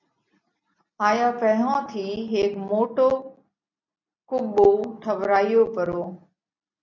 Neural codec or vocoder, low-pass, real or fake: none; 7.2 kHz; real